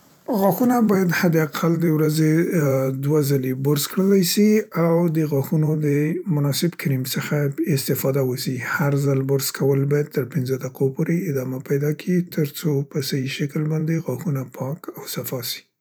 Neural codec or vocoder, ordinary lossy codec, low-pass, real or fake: vocoder, 44.1 kHz, 128 mel bands every 512 samples, BigVGAN v2; none; none; fake